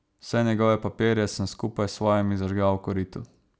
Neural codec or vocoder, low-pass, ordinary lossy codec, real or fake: none; none; none; real